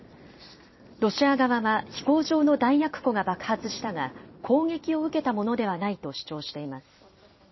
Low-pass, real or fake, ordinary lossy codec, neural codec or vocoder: 7.2 kHz; fake; MP3, 24 kbps; vocoder, 44.1 kHz, 128 mel bands every 256 samples, BigVGAN v2